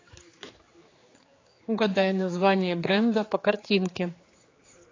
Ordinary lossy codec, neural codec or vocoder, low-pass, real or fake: AAC, 32 kbps; codec, 16 kHz, 4 kbps, X-Codec, HuBERT features, trained on general audio; 7.2 kHz; fake